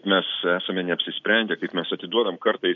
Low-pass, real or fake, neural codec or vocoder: 7.2 kHz; real; none